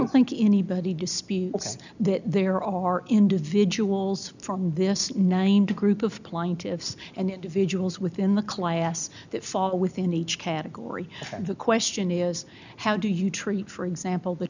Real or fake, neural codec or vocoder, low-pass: real; none; 7.2 kHz